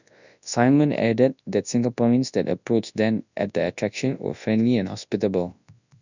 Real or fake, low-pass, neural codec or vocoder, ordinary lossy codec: fake; 7.2 kHz; codec, 24 kHz, 0.9 kbps, WavTokenizer, large speech release; none